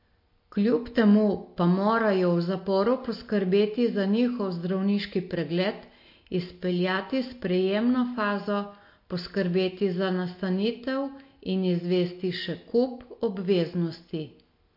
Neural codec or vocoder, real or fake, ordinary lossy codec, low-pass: none; real; MP3, 32 kbps; 5.4 kHz